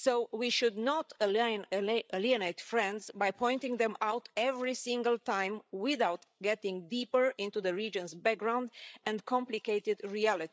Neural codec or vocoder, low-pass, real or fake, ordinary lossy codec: codec, 16 kHz, 8 kbps, FreqCodec, larger model; none; fake; none